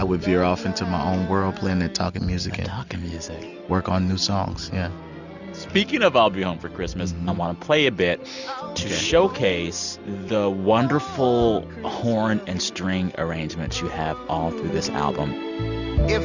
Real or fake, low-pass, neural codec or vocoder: real; 7.2 kHz; none